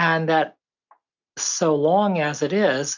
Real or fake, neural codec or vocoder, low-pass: real; none; 7.2 kHz